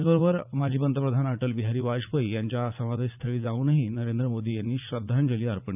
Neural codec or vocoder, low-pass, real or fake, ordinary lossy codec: vocoder, 44.1 kHz, 80 mel bands, Vocos; 3.6 kHz; fake; none